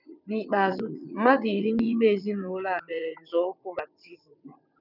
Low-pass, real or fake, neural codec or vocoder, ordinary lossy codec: 5.4 kHz; fake; vocoder, 22.05 kHz, 80 mel bands, WaveNeXt; none